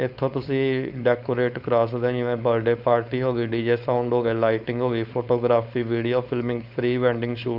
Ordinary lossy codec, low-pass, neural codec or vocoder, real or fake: none; 5.4 kHz; codec, 16 kHz, 4.8 kbps, FACodec; fake